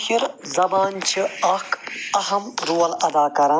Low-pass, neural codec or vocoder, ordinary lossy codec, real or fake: none; none; none; real